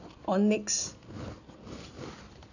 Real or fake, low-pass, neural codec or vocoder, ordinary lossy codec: real; 7.2 kHz; none; none